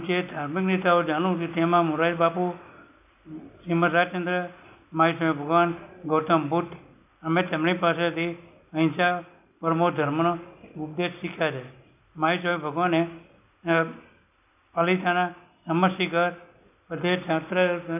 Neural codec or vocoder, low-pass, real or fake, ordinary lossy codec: none; 3.6 kHz; real; none